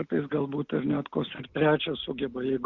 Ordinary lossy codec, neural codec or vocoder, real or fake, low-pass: Opus, 64 kbps; none; real; 7.2 kHz